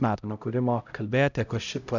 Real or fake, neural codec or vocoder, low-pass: fake; codec, 16 kHz, 0.5 kbps, X-Codec, HuBERT features, trained on LibriSpeech; 7.2 kHz